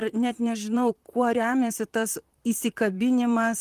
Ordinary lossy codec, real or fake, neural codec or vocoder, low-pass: Opus, 24 kbps; fake; vocoder, 44.1 kHz, 128 mel bands, Pupu-Vocoder; 14.4 kHz